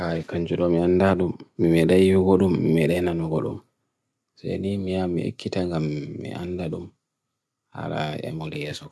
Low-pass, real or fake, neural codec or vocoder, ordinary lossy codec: none; real; none; none